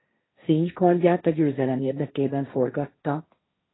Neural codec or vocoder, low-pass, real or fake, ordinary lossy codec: codec, 16 kHz, 1.1 kbps, Voila-Tokenizer; 7.2 kHz; fake; AAC, 16 kbps